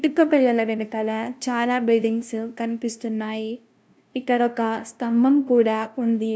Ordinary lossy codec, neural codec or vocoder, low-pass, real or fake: none; codec, 16 kHz, 0.5 kbps, FunCodec, trained on LibriTTS, 25 frames a second; none; fake